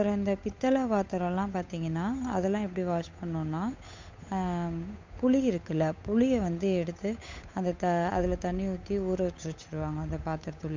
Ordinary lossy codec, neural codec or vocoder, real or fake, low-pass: MP3, 64 kbps; codec, 16 kHz, 8 kbps, FunCodec, trained on Chinese and English, 25 frames a second; fake; 7.2 kHz